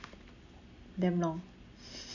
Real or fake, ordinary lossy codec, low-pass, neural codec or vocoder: real; none; 7.2 kHz; none